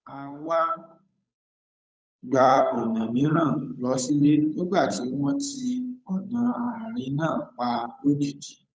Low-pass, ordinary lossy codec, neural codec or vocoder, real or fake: none; none; codec, 16 kHz, 8 kbps, FunCodec, trained on Chinese and English, 25 frames a second; fake